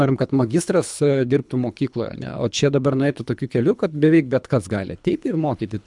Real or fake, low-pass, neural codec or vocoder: fake; 10.8 kHz; codec, 24 kHz, 3 kbps, HILCodec